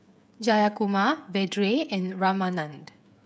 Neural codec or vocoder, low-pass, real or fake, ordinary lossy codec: codec, 16 kHz, 16 kbps, FreqCodec, smaller model; none; fake; none